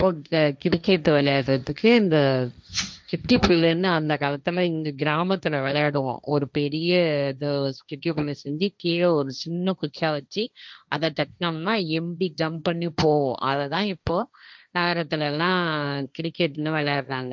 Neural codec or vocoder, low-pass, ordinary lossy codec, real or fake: codec, 16 kHz, 1.1 kbps, Voila-Tokenizer; none; none; fake